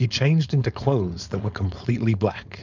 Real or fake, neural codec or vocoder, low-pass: fake; codec, 16 kHz, 4.8 kbps, FACodec; 7.2 kHz